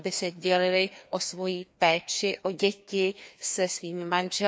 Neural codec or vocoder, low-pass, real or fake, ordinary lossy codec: codec, 16 kHz, 2 kbps, FreqCodec, larger model; none; fake; none